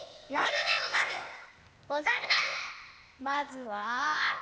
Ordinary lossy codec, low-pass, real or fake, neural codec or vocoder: none; none; fake; codec, 16 kHz, 0.8 kbps, ZipCodec